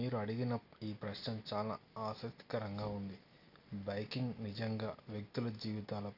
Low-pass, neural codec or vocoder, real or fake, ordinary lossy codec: 5.4 kHz; none; real; none